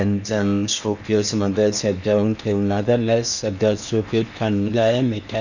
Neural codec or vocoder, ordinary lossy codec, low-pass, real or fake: codec, 16 kHz in and 24 kHz out, 0.8 kbps, FocalCodec, streaming, 65536 codes; none; 7.2 kHz; fake